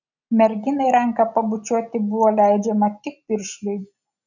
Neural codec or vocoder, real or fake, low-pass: none; real; 7.2 kHz